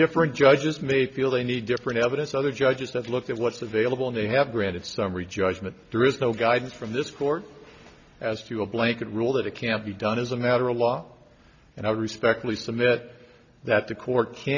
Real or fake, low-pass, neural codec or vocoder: real; 7.2 kHz; none